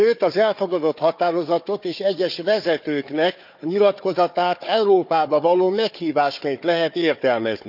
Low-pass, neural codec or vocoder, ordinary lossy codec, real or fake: 5.4 kHz; codec, 16 kHz, 4 kbps, FunCodec, trained on Chinese and English, 50 frames a second; none; fake